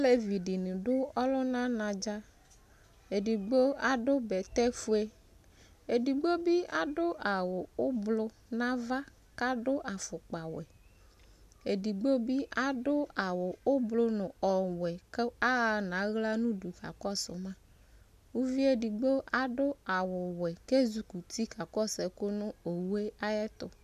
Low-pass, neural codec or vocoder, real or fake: 14.4 kHz; none; real